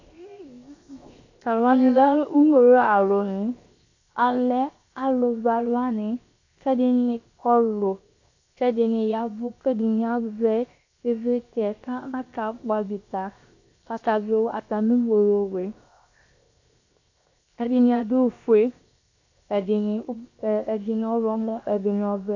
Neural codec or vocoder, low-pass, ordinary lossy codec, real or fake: codec, 16 kHz, 0.7 kbps, FocalCodec; 7.2 kHz; AAC, 48 kbps; fake